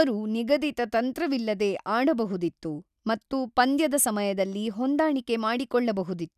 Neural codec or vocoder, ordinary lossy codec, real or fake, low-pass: none; none; real; 14.4 kHz